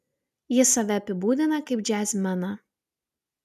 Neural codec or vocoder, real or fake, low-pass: none; real; 14.4 kHz